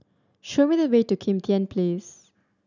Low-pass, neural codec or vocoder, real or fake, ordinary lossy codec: 7.2 kHz; none; real; none